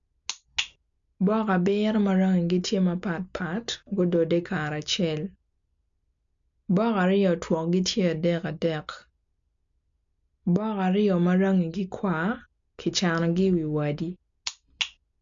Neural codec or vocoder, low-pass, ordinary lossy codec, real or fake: none; 7.2 kHz; MP3, 64 kbps; real